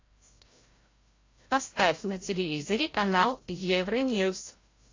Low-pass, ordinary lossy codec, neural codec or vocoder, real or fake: 7.2 kHz; AAC, 32 kbps; codec, 16 kHz, 0.5 kbps, FreqCodec, larger model; fake